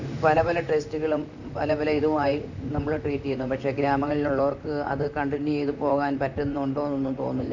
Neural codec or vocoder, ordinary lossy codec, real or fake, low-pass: vocoder, 44.1 kHz, 128 mel bands, Pupu-Vocoder; none; fake; 7.2 kHz